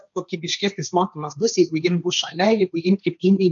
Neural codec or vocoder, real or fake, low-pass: codec, 16 kHz, 1.1 kbps, Voila-Tokenizer; fake; 7.2 kHz